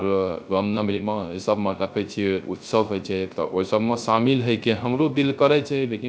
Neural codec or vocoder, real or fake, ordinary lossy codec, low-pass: codec, 16 kHz, 0.3 kbps, FocalCodec; fake; none; none